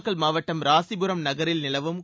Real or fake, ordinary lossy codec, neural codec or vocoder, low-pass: real; none; none; none